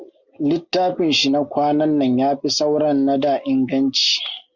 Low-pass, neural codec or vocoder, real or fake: 7.2 kHz; none; real